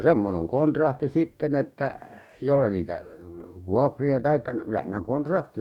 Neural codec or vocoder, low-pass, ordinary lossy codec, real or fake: codec, 44.1 kHz, 2.6 kbps, DAC; 19.8 kHz; none; fake